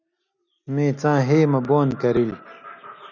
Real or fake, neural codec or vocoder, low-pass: real; none; 7.2 kHz